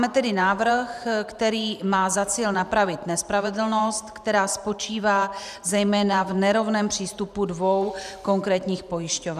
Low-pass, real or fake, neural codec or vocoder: 14.4 kHz; fake; vocoder, 44.1 kHz, 128 mel bands every 512 samples, BigVGAN v2